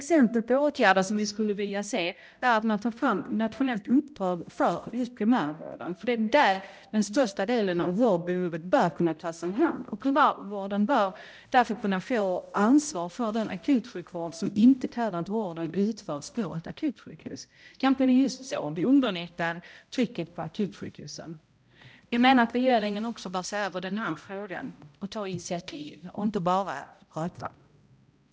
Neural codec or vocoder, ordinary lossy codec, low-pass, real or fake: codec, 16 kHz, 0.5 kbps, X-Codec, HuBERT features, trained on balanced general audio; none; none; fake